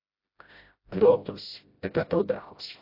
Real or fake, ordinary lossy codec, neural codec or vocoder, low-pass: fake; AAC, 32 kbps; codec, 16 kHz, 0.5 kbps, FreqCodec, smaller model; 5.4 kHz